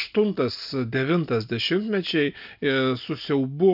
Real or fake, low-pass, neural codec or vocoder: real; 5.4 kHz; none